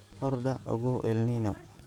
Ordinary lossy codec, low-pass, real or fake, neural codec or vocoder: none; 19.8 kHz; fake; codec, 44.1 kHz, 7.8 kbps, DAC